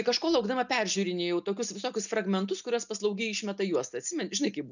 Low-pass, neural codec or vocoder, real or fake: 7.2 kHz; none; real